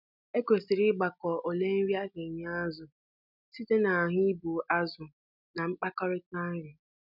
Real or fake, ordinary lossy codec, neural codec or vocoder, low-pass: real; none; none; 5.4 kHz